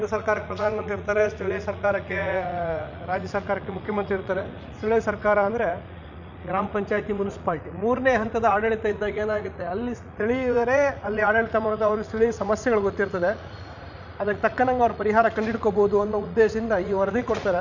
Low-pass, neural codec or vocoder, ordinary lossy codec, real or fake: 7.2 kHz; vocoder, 44.1 kHz, 80 mel bands, Vocos; none; fake